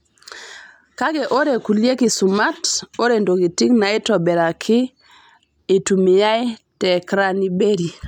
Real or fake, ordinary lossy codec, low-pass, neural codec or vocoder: real; none; 19.8 kHz; none